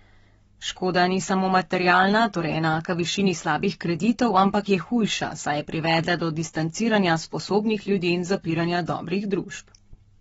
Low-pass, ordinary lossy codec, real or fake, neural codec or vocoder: 19.8 kHz; AAC, 24 kbps; fake; codec, 44.1 kHz, 7.8 kbps, Pupu-Codec